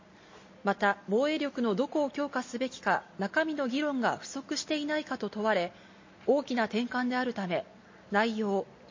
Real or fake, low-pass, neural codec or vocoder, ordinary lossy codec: real; 7.2 kHz; none; MP3, 32 kbps